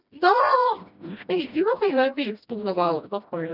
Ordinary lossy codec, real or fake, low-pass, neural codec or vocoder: none; fake; 5.4 kHz; codec, 16 kHz, 1 kbps, FreqCodec, smaller model